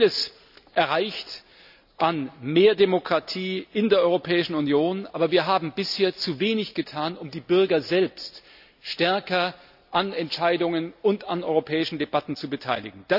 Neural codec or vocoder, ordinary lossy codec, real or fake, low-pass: none; none; real; 5.4 kHz